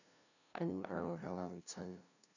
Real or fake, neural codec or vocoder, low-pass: fake; codec, 16 kHz, 0.5 kbps, FunCodec, trained on LibriTTS, 25 frames a second; 7.2 kHz